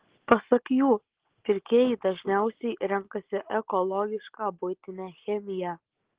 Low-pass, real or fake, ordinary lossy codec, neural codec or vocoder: 3.6 kHz; real; Opus, 16 kbps; none